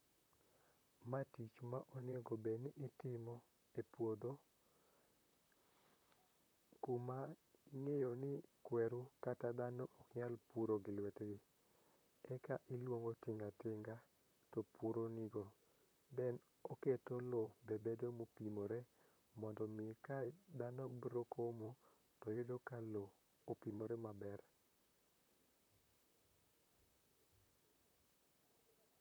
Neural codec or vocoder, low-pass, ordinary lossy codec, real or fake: vocoder, 44.1 kHz, 128 mel bands, Pupu-Vocoder; none; none; fake